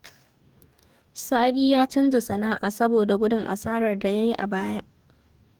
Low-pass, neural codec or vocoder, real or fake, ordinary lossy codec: 19.8 kHz; codec, 44.1 kHz, 2.6 kbps, DAC; fake; Opus, 32 kbps